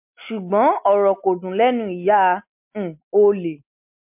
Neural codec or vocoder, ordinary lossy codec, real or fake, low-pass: none; MP3, 32 kbps; real; 3.6 kHz